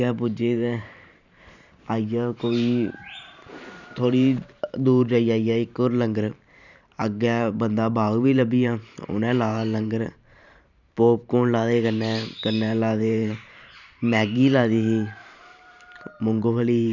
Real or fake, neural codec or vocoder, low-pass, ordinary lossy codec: fake; vocoder, 44.1 kHz, 128 mel bands every 512 samples, BigVGAN v2; 7.2 kHz; none